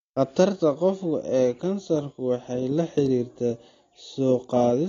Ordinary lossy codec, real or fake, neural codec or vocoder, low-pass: AAC, 32 kbps; real; none; 7.2 kHz